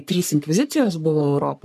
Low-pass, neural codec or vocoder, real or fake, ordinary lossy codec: 14.4 kHz; codec, 44.1 kHz, 3.4 kbps, Pupu-Codec; fake; MP3, 96 kbps